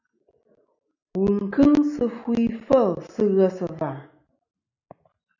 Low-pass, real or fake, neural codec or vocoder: 7.2 kHz; real; none